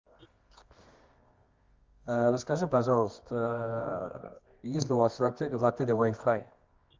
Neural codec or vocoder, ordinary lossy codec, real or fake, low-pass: codec, 24 kHz, 0.9 kbps, WavTokenizer, medium music audio release; Opus, 32 kbps; fake; 7.2 kHz